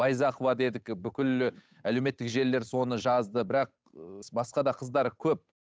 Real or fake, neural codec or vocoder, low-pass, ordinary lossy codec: fake; codec, 16 kHz, 8 kbps, FunCodec, trained on Chinese and English, 25 frames a second; none; none